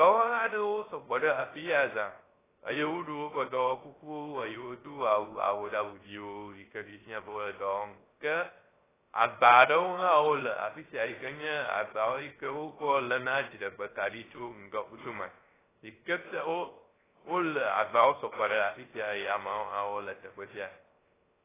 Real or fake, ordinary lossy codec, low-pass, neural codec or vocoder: fake; AAC, 16 kbps; 3.6 kHz; codec, 16 kHz, 0.3 kbps, FocalCodec